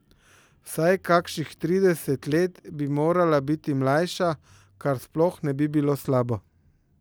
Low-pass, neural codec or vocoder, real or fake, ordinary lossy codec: none; none; real; none